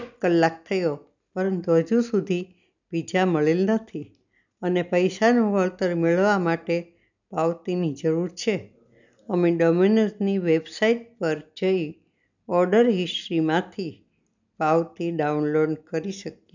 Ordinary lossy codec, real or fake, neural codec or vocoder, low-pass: none; real; none; 7.2 kHz